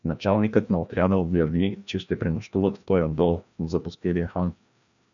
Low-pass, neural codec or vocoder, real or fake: 7.2 kHz; codec, 16 kHz, 1 kbps, FreqCodec, larger model; fake